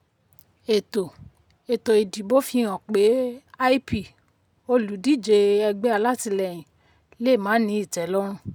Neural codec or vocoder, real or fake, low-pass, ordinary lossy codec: none; real; 19.8 kHz; none